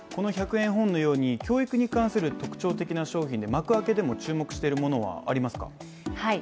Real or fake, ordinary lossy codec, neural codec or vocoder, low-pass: real; none; none; none